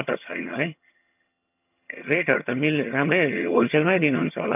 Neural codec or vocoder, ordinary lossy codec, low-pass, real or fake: vocoder, 22.05 kHz, 80 mel bands, HiFi-GAN; none; 3.6 kHz; fake